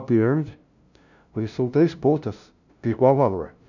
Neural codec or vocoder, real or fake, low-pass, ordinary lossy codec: codec, 16 kHz, 0.5 kbps, FunCodec, trained on LibriTTS, 25 frames a second; fake; 7.2 kHz; none